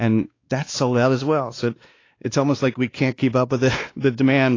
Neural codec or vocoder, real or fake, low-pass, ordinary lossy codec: codec, 16 kHz, 2 kbps, X-Codec, WavLM features, trained on Multilingual LibriSpeech; fake; 7.2 kHz; AAC, 32 kbps